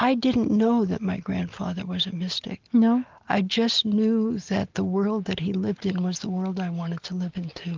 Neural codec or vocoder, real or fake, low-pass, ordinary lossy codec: none; real; 7.2 kHz; Opus, 32 kbps